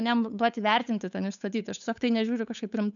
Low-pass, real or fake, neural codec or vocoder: 7.2 kHz; fake; codec, 16 kHz, 8 kbps, FunCodec, trained on Chinese and English, 25 frames a second